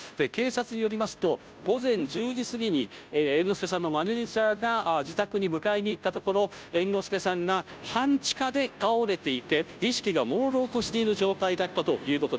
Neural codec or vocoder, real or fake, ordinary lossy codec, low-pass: codec, 16 kHz, 0.5 kbps, FunCodec, trained on Chinese and English, 25 frames a second; fake; none; none